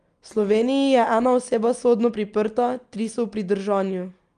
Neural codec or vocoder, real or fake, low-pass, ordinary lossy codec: none; real; 9.9 kHz; Opus, 32 kbps